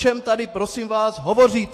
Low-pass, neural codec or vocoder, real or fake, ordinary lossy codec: 14.4 kHz; autoencoder, 48 kHz, 128 numbers a frame, DAC-VAE, trained on Japanese speech; fake; AAC, 48 kbps